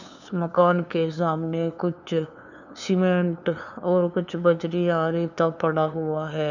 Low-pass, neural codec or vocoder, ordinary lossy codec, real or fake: 7.2 kHz; codec, 16 kHz, 2 kbps, FunCodec, trained on LibriTTS, 25 frames a second; none; fake